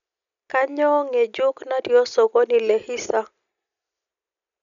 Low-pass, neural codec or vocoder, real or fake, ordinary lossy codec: 7.2 kHz; none; real; none